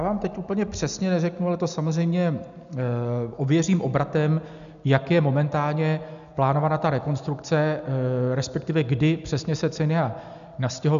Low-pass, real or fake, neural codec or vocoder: 7.2 kHz; real; none